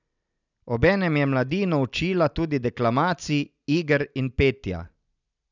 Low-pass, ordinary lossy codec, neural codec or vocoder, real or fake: 7.2 kHz; none; none; real